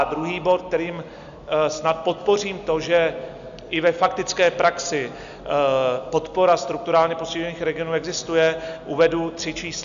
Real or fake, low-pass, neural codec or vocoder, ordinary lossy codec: real; 7.2 kHz; none; MP3, 96 kbps